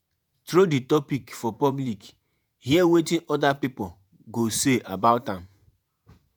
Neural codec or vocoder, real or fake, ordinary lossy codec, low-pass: vocoder, 48 kHz, 128 mel bands, Vocos; fake; none; none